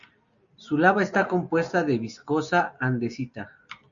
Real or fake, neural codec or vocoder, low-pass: real; none; 7.2 kHz